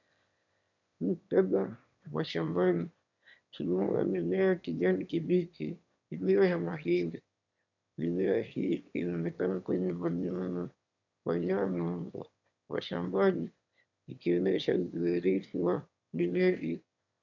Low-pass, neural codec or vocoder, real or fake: 7.2 kHz; autoencoder, 22.05 kHz, a latent of 192 numbers a frame, VITS, trained on one speaker; fake